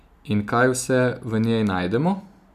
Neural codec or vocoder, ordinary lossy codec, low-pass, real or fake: none; none; 14.4 kHz; real